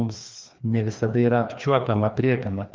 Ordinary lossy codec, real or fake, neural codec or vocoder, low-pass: Opus, 24 kbps; fake; codec, 16 kHz, 2 kbps, FreqCodec, larger model; 7.2 kHz